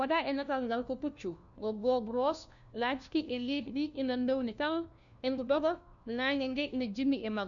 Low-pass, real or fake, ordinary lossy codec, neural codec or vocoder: 7.2 kHz; fake; none; codec, 16 kHz, 1 kbps, FunCodec, trained on LibriTTS, 50 frames a second